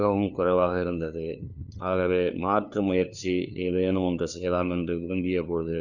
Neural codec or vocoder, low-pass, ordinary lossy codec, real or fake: codec, 16 kHz, 2 kbps, FunCodec, trained on LibriTTS, 25 frames a second; 7.2 kHz; none; fake